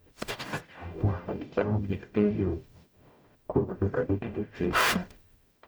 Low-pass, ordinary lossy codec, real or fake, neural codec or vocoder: none; none; fake; codec, 44.1 kHz, 0.9 kbps, DAC